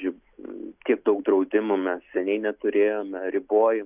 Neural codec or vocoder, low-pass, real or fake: none; 3.6 kHz; real